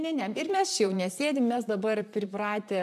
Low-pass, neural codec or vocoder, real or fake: 14.4 kHz; vocoder, 44.1 kHz, 128 mel bands, Pupu-Vocoder; fake